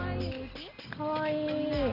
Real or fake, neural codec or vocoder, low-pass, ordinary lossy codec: real; none; 5.4 kHz; Opus, 32 kbps